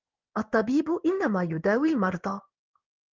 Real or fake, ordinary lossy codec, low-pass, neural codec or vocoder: fake; Opus, 32 kbps; 7.2 kHz; codec, 16 kHz in and 24 kHz out, 1 kbps, XY-Tokenizer